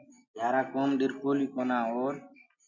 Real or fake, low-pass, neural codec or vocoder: real; 7.2 kHz; none